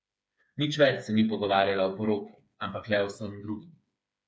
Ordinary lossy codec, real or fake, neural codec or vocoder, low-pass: none; fake; codec, 16 kHz, 4 kbps, FreqCodec, smaller model; none